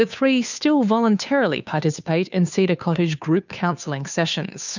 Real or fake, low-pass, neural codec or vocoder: fake; 7.2 kHz; codec, 16 kHz, 2 kbps, FunCodec, trained on Chinese and English, 25 frames a second